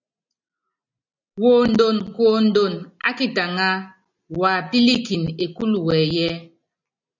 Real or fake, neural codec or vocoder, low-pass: real; none; 7.2 kHz